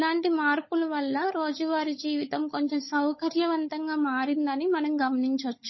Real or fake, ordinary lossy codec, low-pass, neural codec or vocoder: fake; MP3, 24 kbps; 7.2 kHz; codec, 16 kHz, 16 kbps, FunCodec, trained on LibriTTS, 50 frames a second